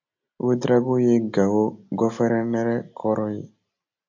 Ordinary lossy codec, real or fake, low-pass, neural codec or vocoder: AAC, 48 kbps; real; 7.2 kHz; none